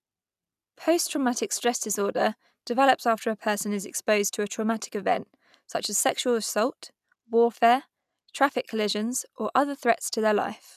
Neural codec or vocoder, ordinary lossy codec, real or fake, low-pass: none; none; real; 14.4 kHz